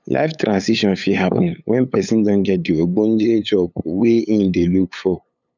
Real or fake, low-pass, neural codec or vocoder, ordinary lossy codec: fake; 7.2 kHz; codec, 16 kHz, 8 kbps, FunCodec, trained on LibriTTS, 25 frames a second; none